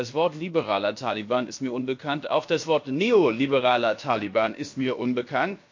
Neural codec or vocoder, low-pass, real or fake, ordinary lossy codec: codec, 16 kHz, about 1 kbps, DyCAST, with the encoder's durations; 7.2 kHz; fake; MP3, 48 kbps